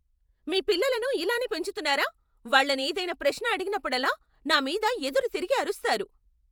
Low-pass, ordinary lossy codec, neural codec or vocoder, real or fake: none; none; vocoder, 48 kHz, 128 mel bands, Vocos; fake